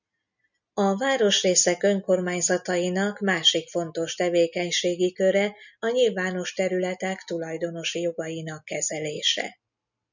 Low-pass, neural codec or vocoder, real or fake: 7.2 kHz; none; real